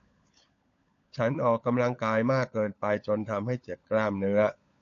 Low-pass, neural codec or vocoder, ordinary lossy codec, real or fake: 7.2 kHz; codec, 16 kHz, 16 kbps, FunCodec, trained on LibriTTS, 50 frames a second; AAC, 48 kbps; fake